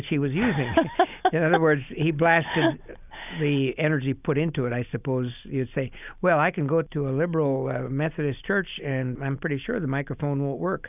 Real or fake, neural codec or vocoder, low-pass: real; none; 3.6 kHz